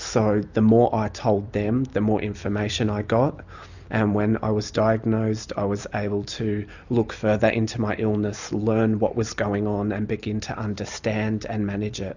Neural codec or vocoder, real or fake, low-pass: none; real; 7.2 kHz